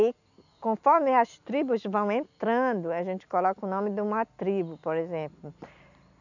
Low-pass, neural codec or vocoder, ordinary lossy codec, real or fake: 7.2 kHz; none; none; real